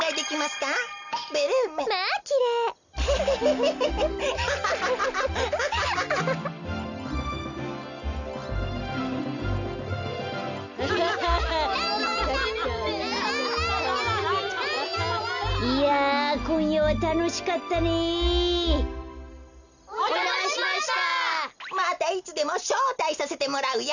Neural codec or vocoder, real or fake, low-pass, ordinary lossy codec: none; real; 7.2 kHz; none